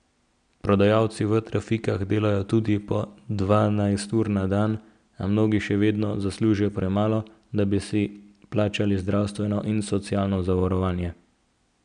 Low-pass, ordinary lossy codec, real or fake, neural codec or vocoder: 9.9 kHz; none; real; none